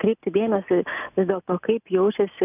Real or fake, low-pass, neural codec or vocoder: real; 3.6 kHz; none